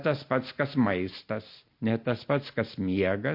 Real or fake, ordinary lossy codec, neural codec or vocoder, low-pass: real; MP3, 32 kbps; none; 5.4 kHz